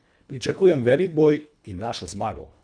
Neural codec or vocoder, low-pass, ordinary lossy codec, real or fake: codec, 24 kHz, 1.5 kbps, HILCodec; 9.9 kHz; none; fake